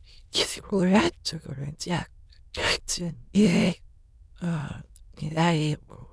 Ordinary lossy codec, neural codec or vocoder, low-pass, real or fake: none; autoencoder, 22.05 kHz, a latent of 192 numbers a frame, VITS, trained on many speakers; none; fake